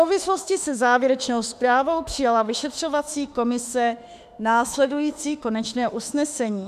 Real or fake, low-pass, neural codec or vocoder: fake; 14.4 kHz; autoencoder, 48 kHz, 32 numbers a frame, DAC-VAE, trained on Japanese speech